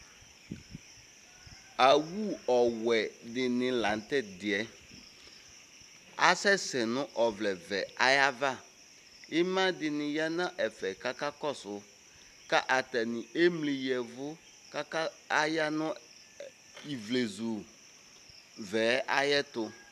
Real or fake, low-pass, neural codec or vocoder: real; 14.4 kHz; none